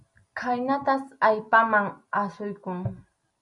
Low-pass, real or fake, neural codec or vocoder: 10.8 kHz; real; none